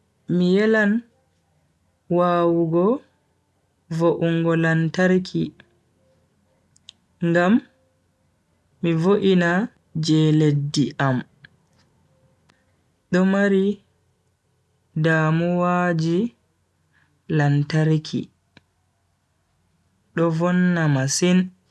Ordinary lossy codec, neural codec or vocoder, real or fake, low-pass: none; none; real; none